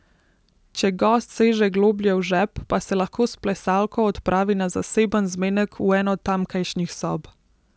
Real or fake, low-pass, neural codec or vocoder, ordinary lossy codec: real; none; none; none